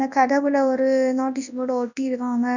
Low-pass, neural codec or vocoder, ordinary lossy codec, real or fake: 7.2 kHz; codec, 24 kHz, 0.9 kbps, WavTokenizer, large speech release; none; fake